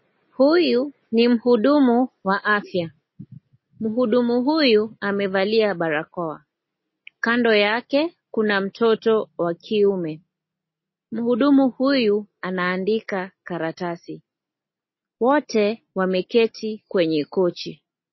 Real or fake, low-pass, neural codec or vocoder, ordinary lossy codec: real; 7.2 kHz; none; MP3, 24 kbps